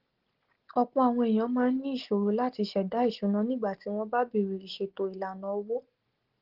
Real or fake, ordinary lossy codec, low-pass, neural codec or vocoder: real; Opus, 16 kbps; 5.4 kHz; none